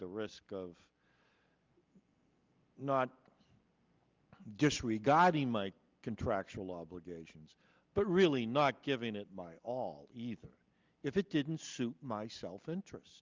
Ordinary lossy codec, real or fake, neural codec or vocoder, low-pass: Opus, 24 kbps; real; none; 7.2 kHz